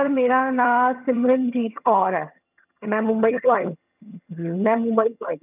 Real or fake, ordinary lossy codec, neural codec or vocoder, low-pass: fake; none; vocoder, 22.05 kHz, 80 mel bands, HiFi-GAN; 3.6 kHz